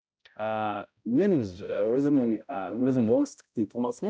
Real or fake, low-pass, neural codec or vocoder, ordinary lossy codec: fake; none; codec, 16 kHz, 0.5 kbps, X-Codec, HuBERT features, trained on balanced general audio; none